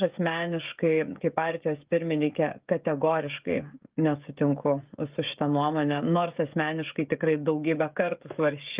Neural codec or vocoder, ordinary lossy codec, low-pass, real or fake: codec, 16 kHz, 16 kbps, FreqCodec, smaller model; Opus, 32 kbps; 3.6 kHz; fake